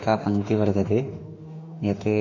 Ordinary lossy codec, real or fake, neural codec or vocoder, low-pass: MP3, 64 kbps; fake; autoencoder, 48 kHz, 32 numbers a frame, DAC-VAE, trained on Japanese speech; 7.2 kHz